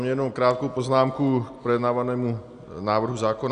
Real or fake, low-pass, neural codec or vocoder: real; 9.9 kHz; none